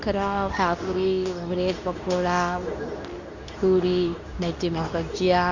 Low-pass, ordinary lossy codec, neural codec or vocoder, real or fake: 7.2 kHz; none; codec, 24 kHz, 0.9 kbps, WavTokenizer, medium speech release version 2; fake